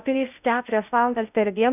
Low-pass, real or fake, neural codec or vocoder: 3.6 kHz; fake; codec, 16 kHz in and 24 kHz out, 0.6 kbps, FocalCodec, streaming, 2048 codes